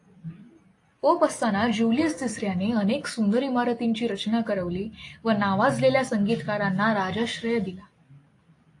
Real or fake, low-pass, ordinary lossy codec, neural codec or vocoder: real; 10.8 kHz; AAC, 48 kbps; none